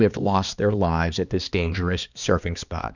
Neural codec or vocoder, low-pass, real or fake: codec, 16 kHz, 2 kbps, X-Codec, HuBERT features, trained on balanced general audio; 7.2 kHz; fake